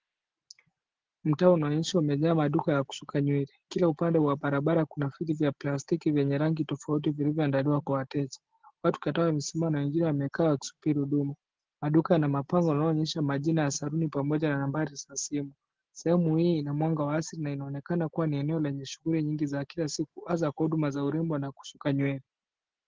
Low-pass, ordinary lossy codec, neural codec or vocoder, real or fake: 7.2 kHz; Opus, 16 kbps; none; real